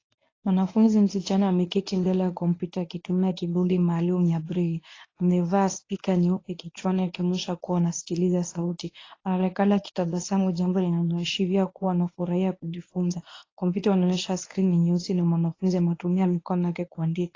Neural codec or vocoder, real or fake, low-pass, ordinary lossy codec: codec, 24 kHz, 0.9 kbps, WavTokenizer, medium speech release version 1; fake; 7.2 kHz; AAC, 32 kbps